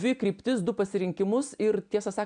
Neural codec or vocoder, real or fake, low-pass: none; real; 9.9 kHz